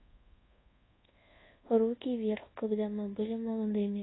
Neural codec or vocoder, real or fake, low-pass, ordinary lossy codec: codec, 24 kHz, 1.2 kbps, DualCodec; fake; 7.2 kHz; AAC, 16 kbps